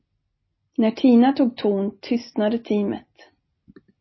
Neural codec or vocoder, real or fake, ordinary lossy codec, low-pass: none; real; MP3, 24 kbps; 7.2 kHz